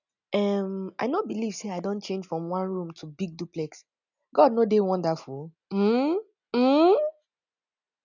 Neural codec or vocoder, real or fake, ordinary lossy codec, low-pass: none; real; none; 7.2 kHz